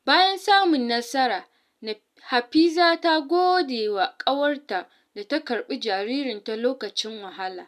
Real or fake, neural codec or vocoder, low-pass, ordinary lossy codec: real; none; 14.4 kHz; none